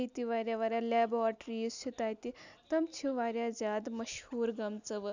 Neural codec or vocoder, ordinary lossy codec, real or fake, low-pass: none; none; real; 7.2 kHz